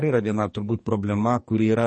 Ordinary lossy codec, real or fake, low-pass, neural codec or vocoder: MP3, 32 kbps; fake; 10.8 kHz; codec, 44.1 kHz, 2.6 kbps, SNAC